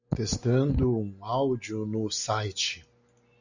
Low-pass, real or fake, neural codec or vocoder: 7.2 kHz; real; none